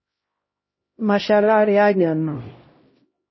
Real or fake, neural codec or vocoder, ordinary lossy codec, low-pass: fake; codec, 16 kHz, 0.5 kbps, X-Codec, HuBERT features, trained on LibriSpeech; MP3, 24 kbps; 7.2 kHz